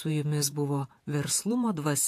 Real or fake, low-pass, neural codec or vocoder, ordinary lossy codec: fake; 14.4 kHz; vocoder, 48 kHz, 128 mel bands, Vocos; AAC, 64 kbps